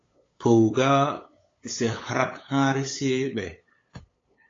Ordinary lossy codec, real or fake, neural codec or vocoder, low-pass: AAC, 32 kbps; fake; codec, 16 kHz, 8 kbps, FunCodec, trained on LibriTTS, 25 frames a second; 7.2 kHz